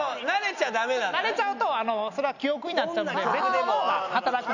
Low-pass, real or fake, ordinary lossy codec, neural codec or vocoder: 7.2 kHz; real; none; none